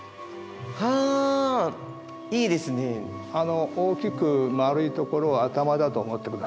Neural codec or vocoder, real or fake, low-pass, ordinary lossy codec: none; real; none; none